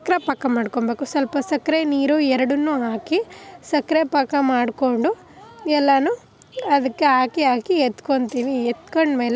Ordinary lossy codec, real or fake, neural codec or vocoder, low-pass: none; real; none; none